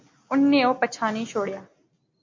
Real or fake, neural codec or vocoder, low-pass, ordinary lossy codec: real; none; 7.2 kHz; MP3, 48 kbps